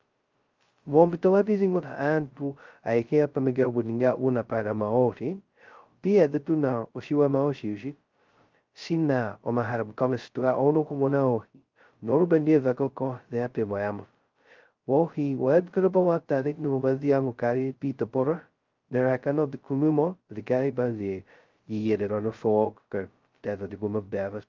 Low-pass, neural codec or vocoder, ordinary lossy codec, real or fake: 7.2 kHz; codec, 16 kHz, 0.2 kbps, FocalCodec; Opus, 32 kbps; fake